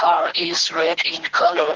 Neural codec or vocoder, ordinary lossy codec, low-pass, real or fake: codec, 24 kHz, 3 kbps, HILCodec; Opus, 16 kbps; 7.2 kHz; fake